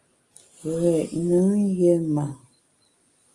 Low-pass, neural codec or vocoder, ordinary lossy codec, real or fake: 10.8 kHz; none; Opus, 32 kbps; real